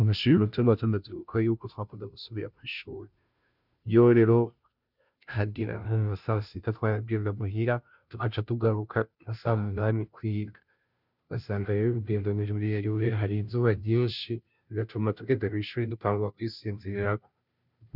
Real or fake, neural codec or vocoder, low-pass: fake; codec, 16 kHz, 0.5 kbps, FunCodec, trained on Chinese and English, 25 frames a second; 5.4 kHz